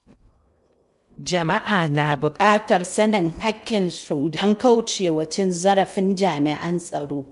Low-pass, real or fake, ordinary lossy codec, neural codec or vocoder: 10.8 kHz; fake; none; codec, 16 kHz in and 24 kHz out, 0.6 kbps, FocalCodec, streaming, 2048 codes